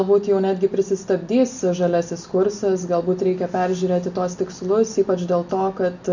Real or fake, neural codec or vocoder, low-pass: real; none; 7.2 kHz